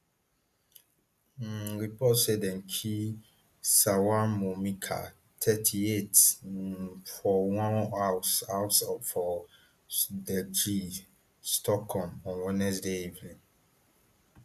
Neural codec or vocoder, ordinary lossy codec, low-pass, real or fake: none; none; 14.4 kHz; real